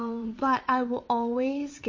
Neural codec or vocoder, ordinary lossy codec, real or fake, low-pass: vocoder, 22.05 kHz, 80 mel bands, WaveNeXt; MP3, 32 kbps; fake; 7.2 kHz